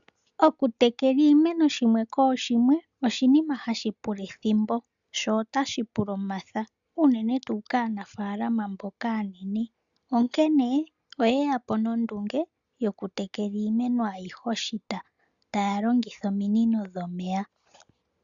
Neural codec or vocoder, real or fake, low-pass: none; real; 7.2 kHz